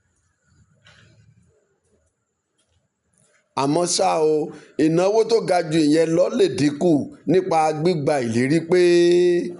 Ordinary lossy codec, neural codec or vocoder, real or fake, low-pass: none; none; real; 10.8 kHz